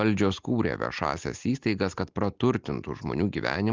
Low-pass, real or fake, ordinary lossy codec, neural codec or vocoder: 7.2 kHz; real; Opus, 32 kbps; none